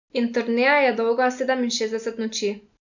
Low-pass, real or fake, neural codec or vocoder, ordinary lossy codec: 7.2 kHz; real; none; none